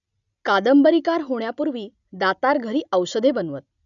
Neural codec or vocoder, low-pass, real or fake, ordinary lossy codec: none; 7.2 kHz; real; none